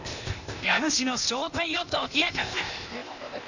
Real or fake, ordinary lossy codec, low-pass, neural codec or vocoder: fake; none; 7.2 kHz; codec, 16 kHz, 0.8 kbps, ZipCodec